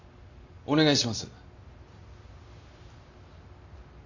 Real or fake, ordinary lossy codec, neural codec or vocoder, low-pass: real; none; none; 7.2 kHz